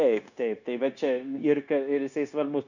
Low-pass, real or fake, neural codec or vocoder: 7.2 kHz; fake; codec, 16 kHz, 0.9 kbps, LongCat-Audio-Codec